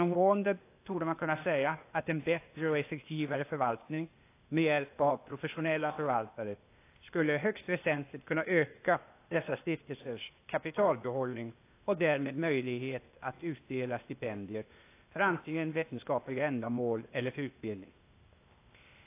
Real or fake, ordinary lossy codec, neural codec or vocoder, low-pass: fake; AAC, 24 kbps; codec, 16 kHz, 0.8 kbps, ZipCodec; 3.6 kHz